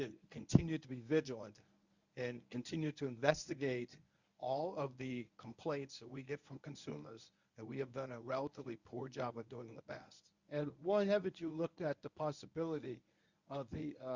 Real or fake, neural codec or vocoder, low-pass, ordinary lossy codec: fake; codec, 24 kHz, 0.9 kbps, WavTokenizer, medium speech release version 1; 7.2 kHz; Opus, 64 kbps